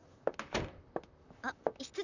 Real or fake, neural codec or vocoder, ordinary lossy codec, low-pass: fake; vocoder, 44.1 kHz, 128 mel bands, Pupu-Vocoder; none; 7.2 kHz